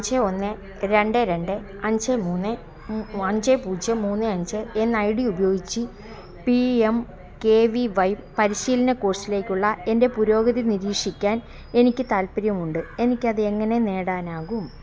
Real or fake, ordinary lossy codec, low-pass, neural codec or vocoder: real; none; none; none